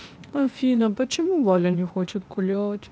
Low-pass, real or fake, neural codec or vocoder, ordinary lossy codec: none; fake; codec, 16 kHz, 0.8 kbps, ZipCodec; none